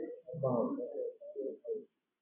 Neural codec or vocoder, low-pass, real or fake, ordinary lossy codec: none; 3.6 kHz; real; AAC, 32 kbps